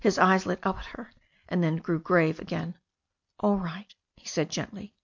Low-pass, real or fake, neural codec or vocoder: 7.2 kHz; real; none